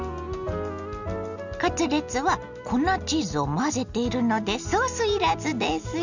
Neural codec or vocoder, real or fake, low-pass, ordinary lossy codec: none; real; 7.2 kHz; none